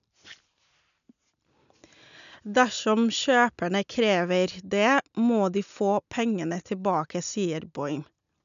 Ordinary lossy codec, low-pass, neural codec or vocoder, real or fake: none; 7.2 kHz; none; real